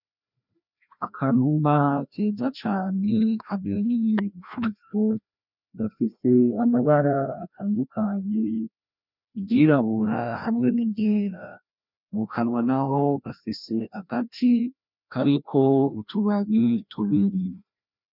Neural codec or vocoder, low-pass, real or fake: codec, 16 kHz, 1 kbps, FreqCodec, larger model; 5.4 kHz; fake